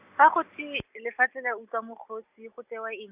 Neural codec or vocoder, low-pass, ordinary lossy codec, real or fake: none; 3.6 kHz; Opus, 64 kbps; real